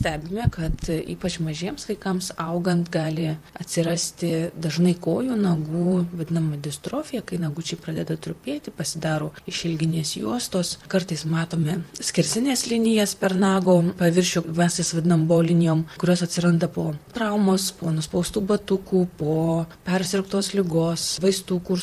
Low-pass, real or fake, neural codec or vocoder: 14.4 kHz; fake; vocoder, 44.1 kHz, 128 mel bands, Pupu-Vocoder